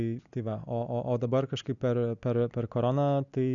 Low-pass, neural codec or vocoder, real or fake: 7.2 kHz; none; real